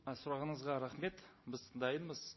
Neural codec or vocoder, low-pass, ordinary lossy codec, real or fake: none; 7.2 kHz; MP3, 24 kbps; real